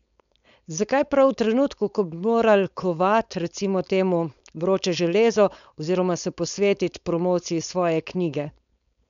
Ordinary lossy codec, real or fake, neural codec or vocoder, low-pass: none; fake; codec, 16 kHz, 4.8 kbps, FACodec; 7.2 kHz